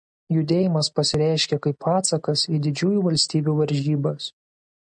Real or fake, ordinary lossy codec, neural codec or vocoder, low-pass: real; MP3, 96 kbps; none; 9.9 kHz